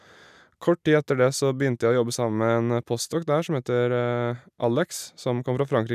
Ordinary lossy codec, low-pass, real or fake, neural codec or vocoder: none; 14.4 kHz; real; none